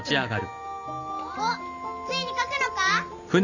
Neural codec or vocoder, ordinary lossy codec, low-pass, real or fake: none; none; 7.2 kHz; real